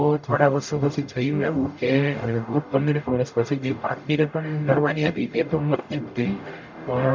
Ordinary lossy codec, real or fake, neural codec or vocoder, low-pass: MP3, 64 kbps; fake; codec, 44.1 kHz, 0.9 kbps, DAC; 7.2 kHz